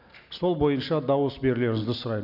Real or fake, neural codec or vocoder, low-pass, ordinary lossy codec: real; none; 5.4 kHz; none